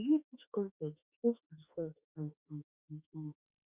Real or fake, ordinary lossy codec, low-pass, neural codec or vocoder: fake; Opus, 64 kbps; 3.6 kHz; autoencoder, 48 kHz, 32 numbers a frame, DAC-VAE, trained on Japanese speech